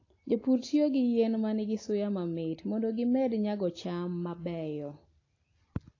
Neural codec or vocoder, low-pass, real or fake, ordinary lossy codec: none; 7.2 kHz; real; AAC, 32 kbps